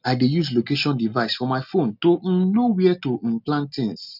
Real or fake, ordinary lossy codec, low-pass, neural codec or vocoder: real; none; 5.4 kHz; none